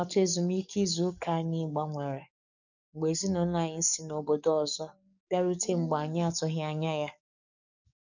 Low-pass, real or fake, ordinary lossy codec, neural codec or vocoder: 7.2 kHz; fake; none; codec, 44.1 kHz, 7.8 kbps, DAC